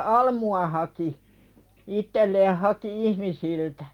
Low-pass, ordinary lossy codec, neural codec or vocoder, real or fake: 19.8 kHz; Opus, 24 kbps; none; real